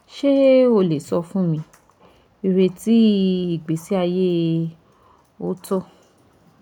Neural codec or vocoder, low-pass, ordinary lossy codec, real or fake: none; 19.8 kHz; none; real